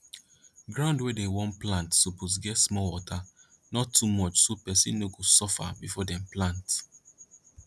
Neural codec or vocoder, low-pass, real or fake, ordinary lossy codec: none; none; real; none